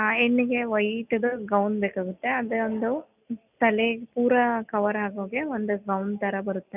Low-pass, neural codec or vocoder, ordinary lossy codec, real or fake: 3.6 kHz; none; none; real